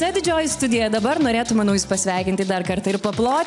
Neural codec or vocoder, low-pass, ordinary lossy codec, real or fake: none; 10.8 kHz; AAC, 64 kbps; real